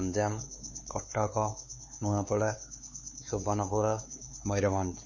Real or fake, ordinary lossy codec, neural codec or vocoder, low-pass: fake; MP3, 32 kbps; codec, 16 kHz, 4 kbps, X-Codec, HuBERT features, trained on LibriSpeech; 7.2 kHz